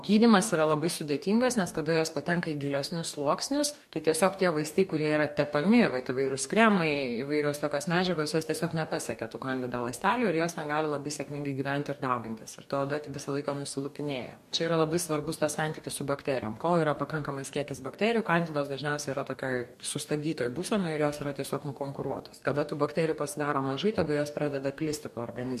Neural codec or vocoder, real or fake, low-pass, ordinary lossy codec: codec, 44.1 kHz, 2.6 kbps, DAC; fake; 14.4 kHz; MP3, 64 kbps